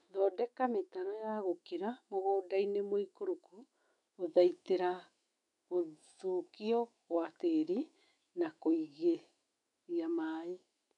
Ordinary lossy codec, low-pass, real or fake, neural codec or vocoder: none; 10.8 kHz; fake; autoencoder, 48 kHz, 128 numbers a frame, DAC-VAE, trained on Japanese speech